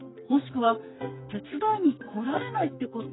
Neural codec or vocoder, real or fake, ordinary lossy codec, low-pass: codec, 44.1 kHz, 2.6 kbps, SNAC; fake; AAC, 16 kbps; 7.2 kHz